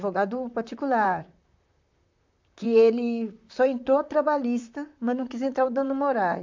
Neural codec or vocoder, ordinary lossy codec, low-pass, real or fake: vocoder, 44.1 kHz, 128 mel bands, Pupu-Vocoder; MP3, 64 kbps; 7.2 kHz; fake